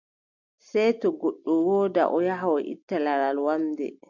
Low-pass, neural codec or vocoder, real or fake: 7.2 kHz; none; real